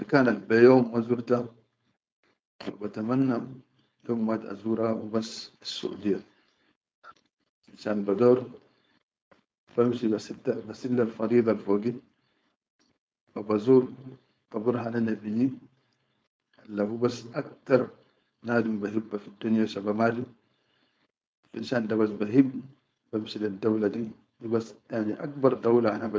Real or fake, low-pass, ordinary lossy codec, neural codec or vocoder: fake; none; none; codec, 16 kHz, 4.8 kbps, FACodec